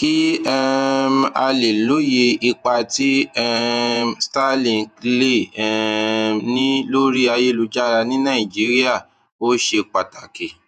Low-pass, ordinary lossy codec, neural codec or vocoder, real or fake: 14.4 kHz; none; vocoder, 48 kHz, 128 mel bands, Vocos; fake